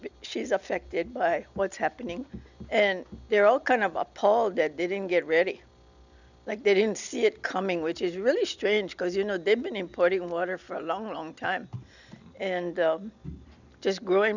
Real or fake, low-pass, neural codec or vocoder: real; 7.2 kHz; none